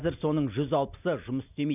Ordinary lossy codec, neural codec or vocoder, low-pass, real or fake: none; none; 3.6 kHz; real